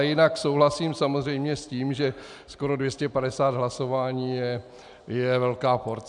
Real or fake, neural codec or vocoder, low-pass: real; none; 10.8 kHz